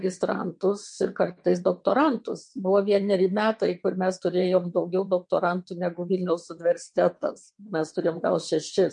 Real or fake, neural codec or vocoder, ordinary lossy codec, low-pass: fake; vocoder, 22.05 kHz, 80 mel bands, WaveNeXt; MP3, 48 kbps; 9.9 kHz